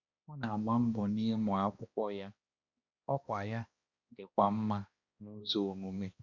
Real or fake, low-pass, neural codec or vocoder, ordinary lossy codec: fake; 7.2 kHz; codec, 16 kHz, 1 kbps, X-Codec, HuBERT features, trained on balanced general audio; Opus, 64 kbps